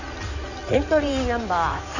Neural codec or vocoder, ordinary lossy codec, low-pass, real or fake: codec, 16 kHz in and 24 kHz out, 2.2 kbps, FireRedTTS-2 codec; none; 7.2 kHz; fake